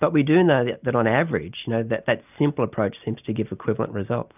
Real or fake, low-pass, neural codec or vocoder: real; 3.6 kHz; none